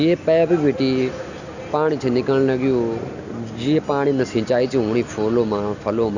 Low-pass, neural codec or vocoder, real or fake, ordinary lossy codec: 7.2 kHz; none; real; none